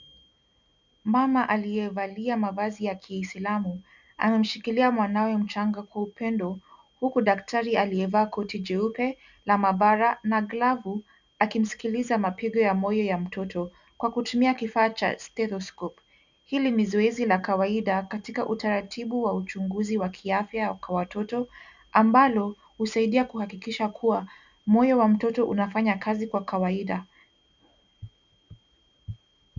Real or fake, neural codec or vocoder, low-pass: real; none; 7.2 kHz